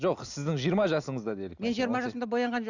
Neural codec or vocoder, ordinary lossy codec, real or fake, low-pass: none; none; real; 7.2 kHz